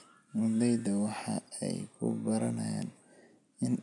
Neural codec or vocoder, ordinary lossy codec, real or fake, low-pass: none; none; real; 10.8 kHz